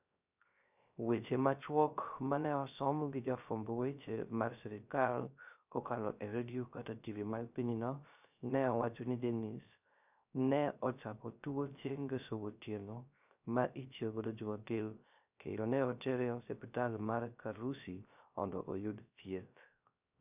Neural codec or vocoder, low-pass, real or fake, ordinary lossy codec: codec, 16 kHz, 0.3 kbps, FocalCodec; 3.6 kHz; fake; none